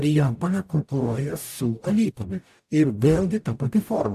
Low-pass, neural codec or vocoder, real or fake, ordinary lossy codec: 14.4 kHz; codec, 44.1 kHz, 0.9 kbps, DAC; fake; AAC, 96 kbps